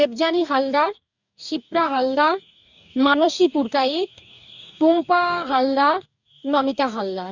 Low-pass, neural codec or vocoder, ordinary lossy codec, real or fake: 7.2 kHz; codec, 44.1 kHz, 2.6 kbps, DAC; none; fake